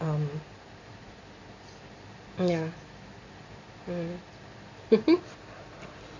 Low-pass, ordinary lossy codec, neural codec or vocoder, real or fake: 7.2 kHz; AAC, 48 kbps; none; real